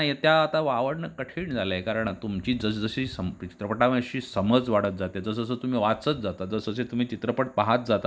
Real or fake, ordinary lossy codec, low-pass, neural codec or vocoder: real; none; none; none